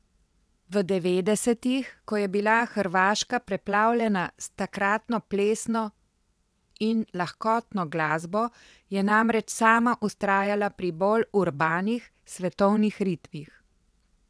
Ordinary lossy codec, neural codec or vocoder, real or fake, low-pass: none; vocoder, 22.05 kHz, 80 mel bands, WaveNeXt; fake; none